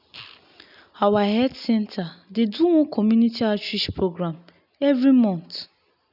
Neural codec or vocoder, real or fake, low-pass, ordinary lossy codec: none; real; 5.4 kHz; none